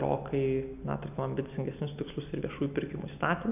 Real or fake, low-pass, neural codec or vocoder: real; 3.6 kHz; none